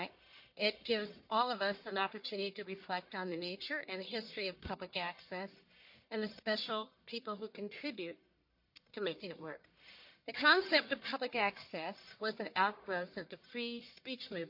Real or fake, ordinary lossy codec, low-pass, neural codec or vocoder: fake; MP3, 32 kbps; 5.4 kHz; codec, 44.1 kHz, 1.7 kbps, Pupu-Codec